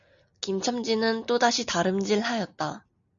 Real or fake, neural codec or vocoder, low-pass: real; none; 7.2 kHz